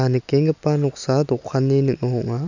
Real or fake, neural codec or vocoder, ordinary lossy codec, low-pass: real; none; none; 7.2 kHz